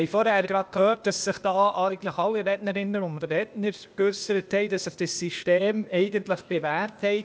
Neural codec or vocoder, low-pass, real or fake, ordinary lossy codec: codec, 16 kHz, 0.8 kbps, ZipCodec; none; fake; none